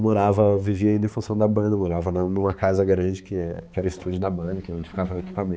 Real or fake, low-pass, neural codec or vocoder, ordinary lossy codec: fake; none; codec, 16 kHz, 4 kbps, X-Codec, HuBERT features, trained on balanced general audio; none